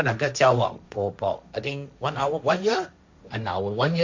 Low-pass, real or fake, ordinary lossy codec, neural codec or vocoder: 7.2 kHz; fake; MP3, 64 kbps; codec, 16 kHz, 1.1 kbps, Voila-Tokenizer